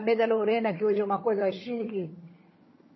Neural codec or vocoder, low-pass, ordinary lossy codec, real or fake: vocoder, 22.05 kHz, 80 mel bands, HiFi-GAN; 7.2 kHz; MP3, 24 kbps; fake